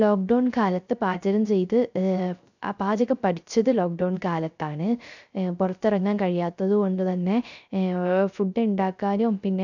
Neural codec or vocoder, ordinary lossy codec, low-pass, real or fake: codec, 16 kHz, 0.3 kbps, FocalCodec; none; 7.2 kHz; fake